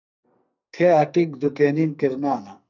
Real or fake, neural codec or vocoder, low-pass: fake; codec, 44.1 kHz, 2.6 kbps, SNAC; 7.2 kHz